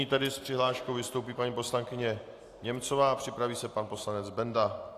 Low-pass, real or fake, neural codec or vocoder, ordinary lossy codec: 14.4 kHz; real; none; AAC, 64 kbps